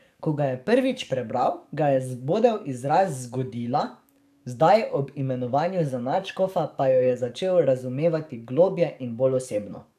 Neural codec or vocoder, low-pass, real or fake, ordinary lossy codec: codec, 44.1 kHz, 7.8 kbps, DAC; 14.4 kHz; fake; none